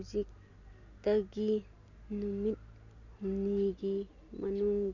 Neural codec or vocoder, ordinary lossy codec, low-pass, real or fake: none; none; 7.2 kHz; real